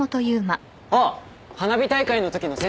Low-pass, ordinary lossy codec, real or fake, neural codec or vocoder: none; none; real; none